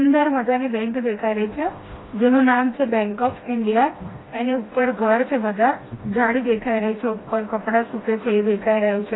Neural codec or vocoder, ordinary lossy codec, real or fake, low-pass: codec, 16 kHz, 1 kbps, FreqCodec, smaller model; AAC, 16 kbps; fake; 7.2 kHz